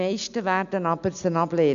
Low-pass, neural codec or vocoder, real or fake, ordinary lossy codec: 7.2 kHz; none; real; none